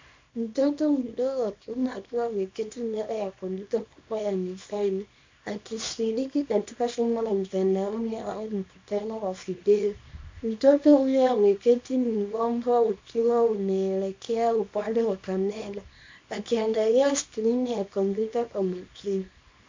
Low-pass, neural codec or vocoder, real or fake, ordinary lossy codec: 7.2 kHz; codec, 24 kHz, 0.9 kbps, WavTokenizer, small release; fake; MP3, 64 kbps